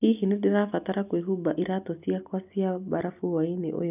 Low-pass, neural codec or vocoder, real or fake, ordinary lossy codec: 3.6 kHz; none; real; none